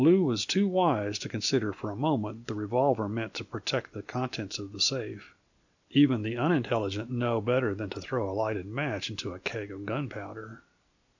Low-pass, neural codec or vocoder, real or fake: 7.2 kHz; none; real